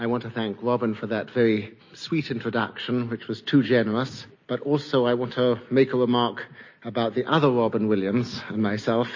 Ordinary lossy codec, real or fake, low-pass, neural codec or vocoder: MP3, 32 kbps; real; 7.2 kHz; none